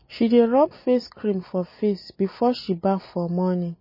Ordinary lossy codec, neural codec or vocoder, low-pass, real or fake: MP3, 24 kbps; none; 5.4 kHz; real